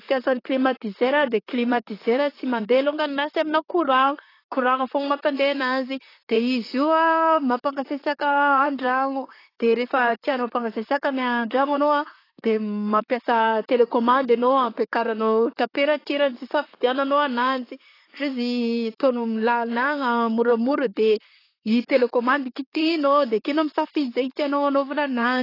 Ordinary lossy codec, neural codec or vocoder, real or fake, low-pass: AAC, 24 kbps; vocoder, 44.1 kHz, 128 mel bands, Pupu-Vocoder; fake; 5.4 kHz